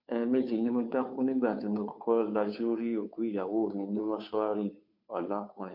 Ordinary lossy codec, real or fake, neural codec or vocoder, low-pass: MP3, 48 kbps; fake; codec, 16 kHz, 2 kbps, FunCodec, trained on Chinese and English, 25 frames a second; 5.4 kHz